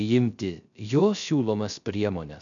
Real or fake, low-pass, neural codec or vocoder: fake; 7.2 kHz; codec, 16 kHz, 0.3 kbps, FocalCodec